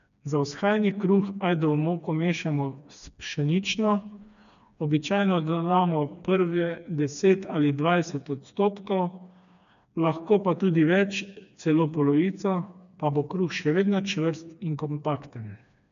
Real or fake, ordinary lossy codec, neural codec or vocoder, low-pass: fake; none; codec, 16 kHz, 2 kbps, FreqCodec, smaller model; 7.2 kHz